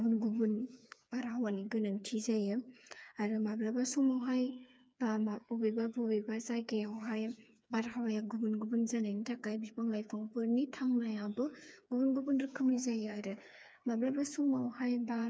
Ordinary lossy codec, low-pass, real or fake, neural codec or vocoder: none; none; fake; codec, 16 kHz, 4 kbps, FreqCodec, smaller model